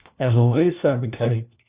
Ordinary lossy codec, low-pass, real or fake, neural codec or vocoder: Opus, 64 kbps; 3.6 kHz; fake; codec, 16 kHz, 1 kbps, FunCodec, trained on LibriTTS, 50 frames a second